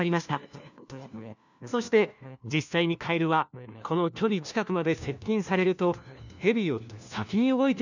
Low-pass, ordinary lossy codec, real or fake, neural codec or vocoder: 7.2 kHz; none; fake; codec, 16 kHz, 1 kbps, FunCodec, trained on LibriTTS, 50 frames a second